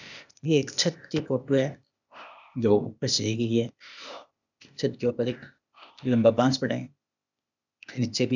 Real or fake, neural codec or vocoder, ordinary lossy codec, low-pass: fake; codec, 16 kHz, 0.8 kbps, ZipCodec; none; 7.2 kHz